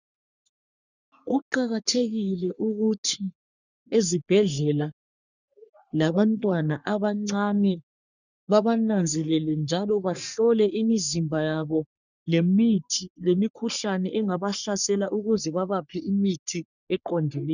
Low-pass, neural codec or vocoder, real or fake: 7.2 kHz; codec, 44.1 kHz, 3.4 kbps, Pupu-Codec; fake